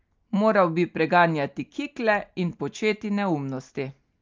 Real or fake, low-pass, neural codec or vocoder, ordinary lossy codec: real; 7.2 kHz; none; Opus, 24 kbps